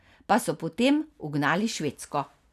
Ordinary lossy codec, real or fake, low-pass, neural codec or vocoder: none; real; 14.4 kHz; none